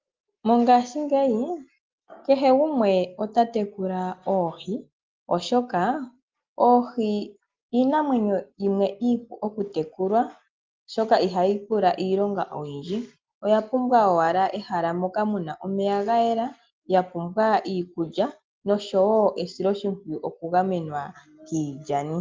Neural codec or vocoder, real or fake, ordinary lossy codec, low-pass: none; real; Opus, 32 kbps; 7.2 kHz